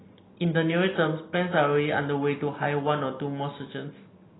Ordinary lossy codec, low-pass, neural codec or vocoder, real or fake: AAC, 16 kbps; 7.2 kHz; none; real